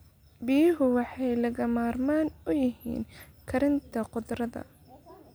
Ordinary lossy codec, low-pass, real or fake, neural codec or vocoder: none; none; real; none